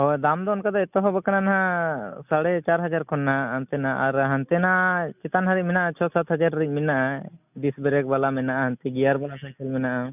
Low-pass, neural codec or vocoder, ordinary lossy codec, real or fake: 3.6 kHz; none; none; real